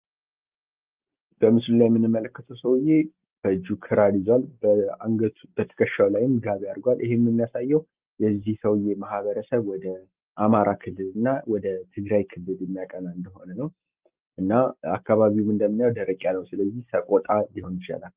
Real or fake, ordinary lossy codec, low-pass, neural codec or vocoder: real; Opus, 32 kbps; 3.6 kHz; none